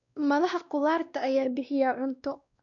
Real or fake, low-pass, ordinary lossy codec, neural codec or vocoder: fake; 7.2 kHz; none; codec, 16 kHz, 1 kbps, X-Codec, WavLM features, trained on Multilingual LibriSpeech